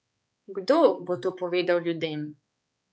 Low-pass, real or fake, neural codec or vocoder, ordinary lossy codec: none; fake; codec, 16 kHz, 4 kbps, X-Codec, HuBERT features, trained on balanced general audio; none